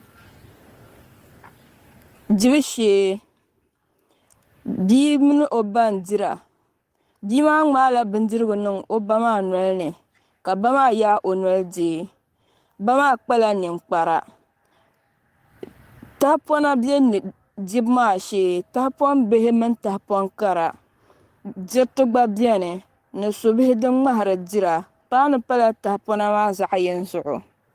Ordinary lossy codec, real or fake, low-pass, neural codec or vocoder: Opus, 24 kbps; fake; 14.4 kHz; codec, 44.1 kHz, 7.8 kbps, Pupu-Codec